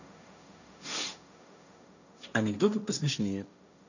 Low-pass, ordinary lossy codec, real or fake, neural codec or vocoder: 7.2 kHz; none; fake; codec, 16 kHz, 1.1 kbps, Voila-Tokenizer